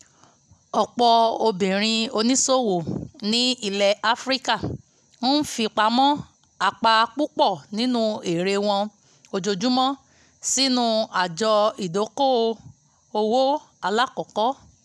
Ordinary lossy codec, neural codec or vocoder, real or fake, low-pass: none; none; real; none